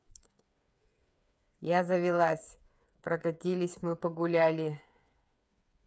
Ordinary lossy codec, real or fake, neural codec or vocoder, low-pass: none; fake; codec, 16 kHz, 8 kbps, FreqCodec, smaller model; none